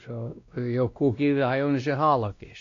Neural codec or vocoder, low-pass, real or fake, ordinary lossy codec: codec, 16 kHz, 1 kbps, X-Codec, WavLM features, trained on Multilingual LibriSpeech; 7.2 kHz; fake; AAC, 48 kbps